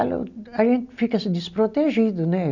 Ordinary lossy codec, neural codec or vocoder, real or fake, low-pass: none; none; real; 7.2 kHz